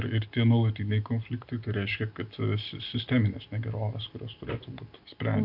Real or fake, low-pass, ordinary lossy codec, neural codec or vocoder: real; 5.4 kHz; MP3, 48 kbps; none